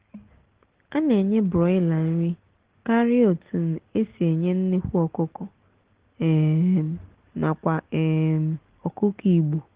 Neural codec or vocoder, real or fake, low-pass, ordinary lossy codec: none; real; 3.6 kHz; Opus, 16 kbps